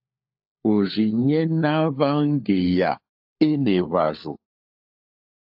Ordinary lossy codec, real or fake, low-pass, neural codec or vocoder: AAC, 48 kbps; fake; 5.4 kHz; codec, 16 kHz, 4 kbps, FunCodec, trained on LibriTTS, 50 frames a second